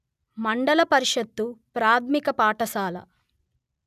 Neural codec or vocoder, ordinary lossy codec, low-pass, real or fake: none; none; 14.4 kHz; real